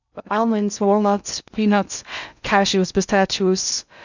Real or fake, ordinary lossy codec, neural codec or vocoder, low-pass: fake; none; codec, 16 kHz in and 24 kHz out, 0.6 kbps, FocalCodec, streaming, 2048 codes; 7.2 kHz